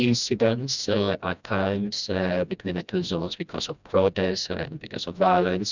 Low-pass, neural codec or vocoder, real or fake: 7.2 kHz; codec, 16 kHz, 1 kbps, FreqCodec, smaller model; fake